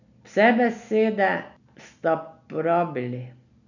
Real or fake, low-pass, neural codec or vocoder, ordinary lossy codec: real; 7.2 kHz; none; none